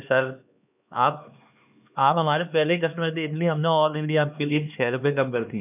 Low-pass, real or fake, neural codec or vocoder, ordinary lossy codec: 3.6 kHz; fake; codec, 16 kHz, 2 kbps, X-Codec, HuBERT features, trained on LibriSpeech; none